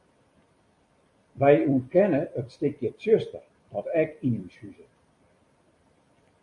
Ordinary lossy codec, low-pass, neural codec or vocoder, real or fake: MP3, 48 kbps; 10.8 kHz; vocoder, 44.1 kHz, 128 mel bands every 256 samples, BigVGAN v2; fake